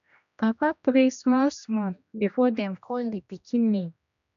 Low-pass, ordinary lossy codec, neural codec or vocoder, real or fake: 7.2 kHz; none; codec, 16 kHz, 1 kbps, X-Codec, HuBERT features, trained on general audio; fake